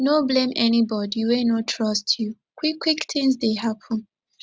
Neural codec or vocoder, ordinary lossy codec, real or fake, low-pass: none; none; real; none